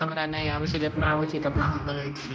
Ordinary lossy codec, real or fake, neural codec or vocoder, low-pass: none; fake; codec, 16 kHz, 1 kbps, X-Codec, HuBERT features, trained on general audio; none